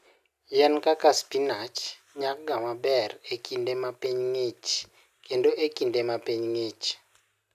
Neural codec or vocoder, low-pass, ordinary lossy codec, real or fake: none; 14.4 kHz; none; real